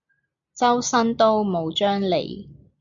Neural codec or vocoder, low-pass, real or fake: none; 7.2 kHz; real